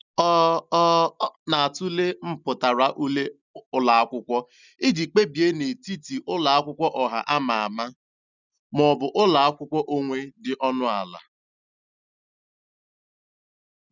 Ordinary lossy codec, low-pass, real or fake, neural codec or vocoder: none; 7.2 kHz; real; none